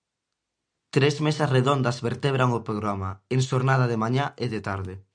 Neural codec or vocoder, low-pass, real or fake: none; 9.9 kHz; real